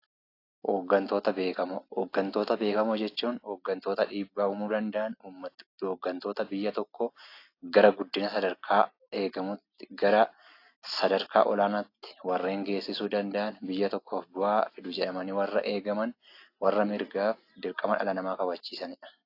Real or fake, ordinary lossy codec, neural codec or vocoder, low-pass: real; AAC, 32 kbps; none; 5.4 kHz